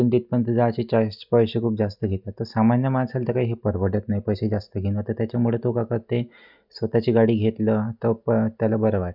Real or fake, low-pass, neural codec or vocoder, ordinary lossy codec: real; 5.4 kHz; none; none